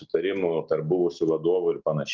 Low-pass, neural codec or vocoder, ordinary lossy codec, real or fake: 7.2 kHz; none; Opus, 32 kbps; real